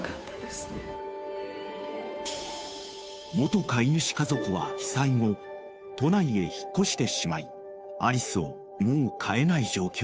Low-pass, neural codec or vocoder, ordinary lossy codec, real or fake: none; codec, 16 kHz, 2 kbps, FunCodec, trained on Chinese and English, 25 frames a second; none; fake